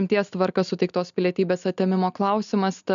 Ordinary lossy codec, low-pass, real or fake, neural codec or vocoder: MP3, 96 kbps; 7.2 kHz; real; none